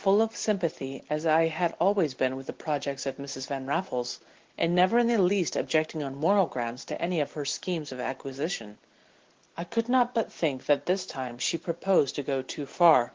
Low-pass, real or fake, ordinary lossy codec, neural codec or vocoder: 7.2 kHz; real; Opus, 16 kbps; none